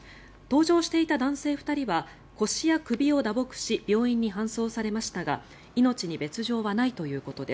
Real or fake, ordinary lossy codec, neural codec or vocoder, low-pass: real; none; none; none